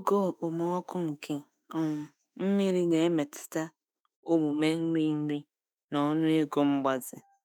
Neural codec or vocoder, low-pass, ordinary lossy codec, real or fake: autoencoder, 48 kHz, 32 numbers a frame, DAC-VAE, trained on Japanese speech; none; none; fake